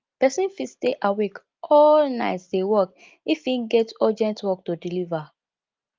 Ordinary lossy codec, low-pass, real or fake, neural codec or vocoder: Opus, 32 kbps; 7.2 kHz; real; none